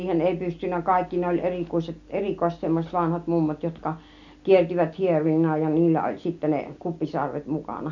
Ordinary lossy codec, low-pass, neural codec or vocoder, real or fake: none; 7.2 kHz; none; real